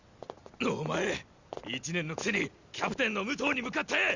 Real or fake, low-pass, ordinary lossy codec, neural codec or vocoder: real; 7.2 kHz; Opus, 64 kbps; none